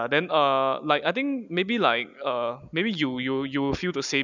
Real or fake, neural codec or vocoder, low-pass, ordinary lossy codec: real; none; 7.2 kHz; none